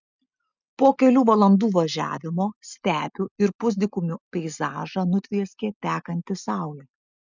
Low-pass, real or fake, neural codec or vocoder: 7.2 kHz; real; none